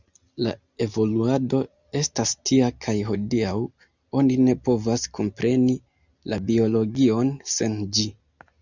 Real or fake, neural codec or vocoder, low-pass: real; none; 7.2 kHz